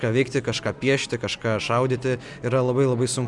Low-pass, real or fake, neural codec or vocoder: 10.8 kHz; real; none